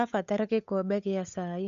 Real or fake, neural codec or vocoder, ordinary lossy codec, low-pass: fake; codec, 16 kHz, 8 kbps, FunCodec, trained on Chinese and English, 25 frames a second; MP3, 96 kbps; 7.2 kHz